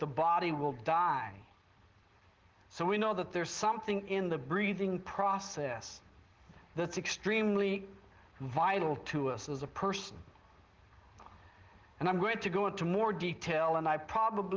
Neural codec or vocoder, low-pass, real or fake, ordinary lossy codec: none; 7.2 kHz; real; Opus, 16 kbps